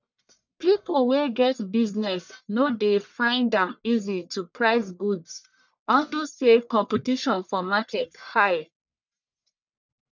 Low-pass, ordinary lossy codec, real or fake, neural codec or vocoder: 7.2 kHz; none; fake; codec, 44.1 kHz, 1.7 kbps, Pupu-Codec